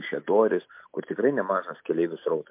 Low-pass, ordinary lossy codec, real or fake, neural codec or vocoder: 3.6 kHz; MP3, 32 kbps; real; none